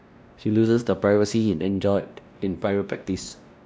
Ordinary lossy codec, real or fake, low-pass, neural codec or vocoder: none; fake; none; codec, 16 kHz, 1 kbps, X-Codec, WavLM features, trained on Multilingual LibriSpeech